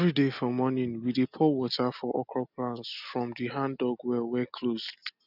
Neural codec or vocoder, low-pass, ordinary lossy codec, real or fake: none; 5.4 kHz; none; real